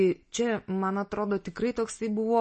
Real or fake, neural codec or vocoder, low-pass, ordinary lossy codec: real; none; 10.8 kHz; MP3, 32 kbps